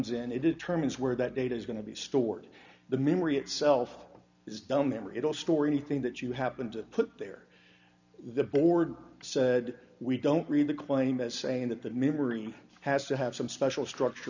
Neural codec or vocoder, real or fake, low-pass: none; real; 7.2 kHz